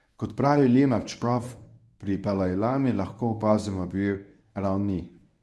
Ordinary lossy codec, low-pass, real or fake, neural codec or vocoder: none; none; fake; codec, 24 kHz, 0.9 kbps, WavTokenizer, medium speech release version 1